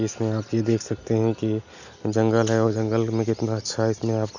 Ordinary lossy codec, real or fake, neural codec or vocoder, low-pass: none; real; none; 7.2 kHz